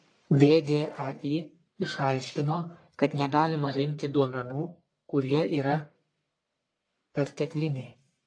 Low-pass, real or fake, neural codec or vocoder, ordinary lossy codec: 9.9 kHz; fake; codec, 44.1 kHz, 1.7 kbps, Pupu-Codec; AAC, 48 kbps